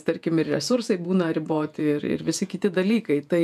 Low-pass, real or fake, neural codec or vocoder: 14.4 kHz; fake; vocoder, 48 kHz, 128 mel bands, Vocos